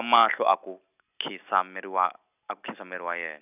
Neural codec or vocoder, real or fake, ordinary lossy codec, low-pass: none; real; none; 3.6 kHz